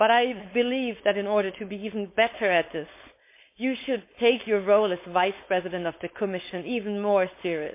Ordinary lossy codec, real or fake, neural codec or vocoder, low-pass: MP3, 24 kbps; fake; codec, 16 kHz, 4.8 kbps, FACodec; 3.6 kHz